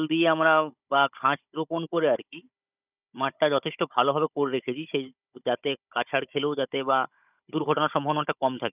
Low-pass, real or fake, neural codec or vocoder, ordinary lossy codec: 3.6 kHz; fake; codec, 16 kHz, 16 kbps, FunCodec, trained on Chinese and English, 50 frames a second; none